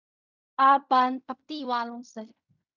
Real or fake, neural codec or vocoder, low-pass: fake; codec, 16 kHz in and 24 kHz out, 0.4 kbps, LongCat-Audio-Codec, fine tuned four codebook decoder; 7.2 kHz